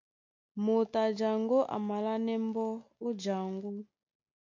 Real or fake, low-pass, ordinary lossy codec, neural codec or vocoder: real; 7.2 kHz; MP3, 48 kbps; none